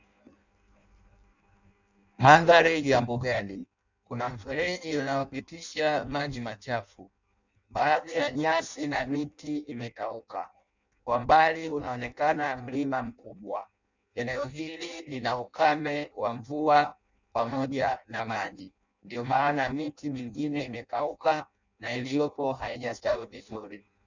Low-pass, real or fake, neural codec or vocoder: 7.2 kHz; fake; codec, 16 kHz in and 24 kHz out, 0.6 kbps, FireRedTTS-2 codec